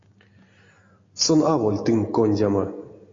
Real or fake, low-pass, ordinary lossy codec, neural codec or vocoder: real; 7.2 kHz; AAC, 32 kbps; none